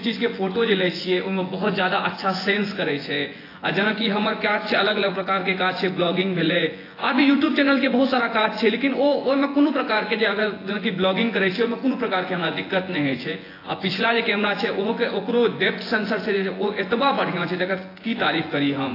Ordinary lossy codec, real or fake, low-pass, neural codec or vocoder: AAC, 24 kbps; fake; 5.4 kHz; vocoder, 24 kHz, 100 mel bands, Vocos